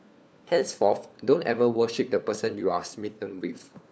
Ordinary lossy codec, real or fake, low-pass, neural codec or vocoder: none; fake; none; codec, 16 kHz, 4 kbps, FunCodec, trained on LibriTTS, 50 frames a second